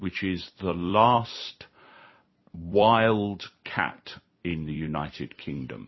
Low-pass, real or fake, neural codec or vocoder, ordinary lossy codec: 7.2 kHz; fake; vocoder, 44.1 kHz, 128 mel bands every 256 samples, BigVGAN v2; MP3, 24 kbps